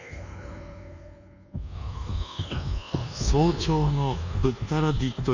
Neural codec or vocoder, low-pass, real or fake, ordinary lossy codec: codec, 24 kHz, 1.2 kbps, DualCodec; 7.2 kHz; fake; none